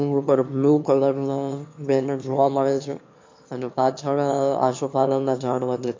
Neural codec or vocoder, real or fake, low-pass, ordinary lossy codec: autoencoder, 22.05 kHz, a latent of 192 numbers a frame, VITS, trained on one speaker; fake; 7.2 kHz; MP3, 48 kbps